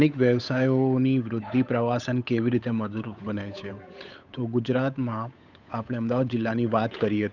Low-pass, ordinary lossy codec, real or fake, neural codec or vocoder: 7.2 kHz; none; fake; codec, 16 kHz, 8 kbps, FunCodec, trained on Chinese and English, 25 frames a second